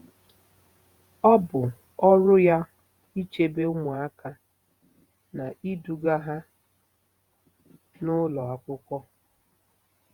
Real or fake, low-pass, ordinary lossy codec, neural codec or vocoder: fake; 19.8 kHz; Opus, 64 kbps; vocoder, 48 kHz, 128 mel bands, Vocos